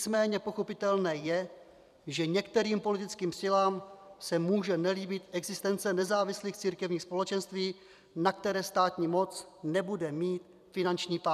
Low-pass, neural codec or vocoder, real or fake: 14.4 kHz; vocoder, 44.1 kHz, 128 mel bands every 256 samples, BigVGAN v2; fake